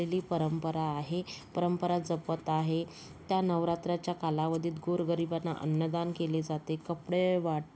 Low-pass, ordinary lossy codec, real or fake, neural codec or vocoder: none; none; real; none